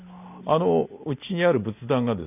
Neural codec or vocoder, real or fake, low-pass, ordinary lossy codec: none; real; 3.6 kHz; none